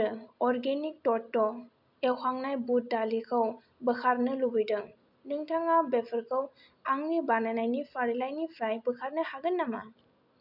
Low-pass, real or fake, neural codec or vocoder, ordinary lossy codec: 5.4 kHz; real; none; none